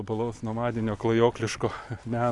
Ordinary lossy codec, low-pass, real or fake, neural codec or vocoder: AAC, 64 kbps; 10.8 kHz; real; none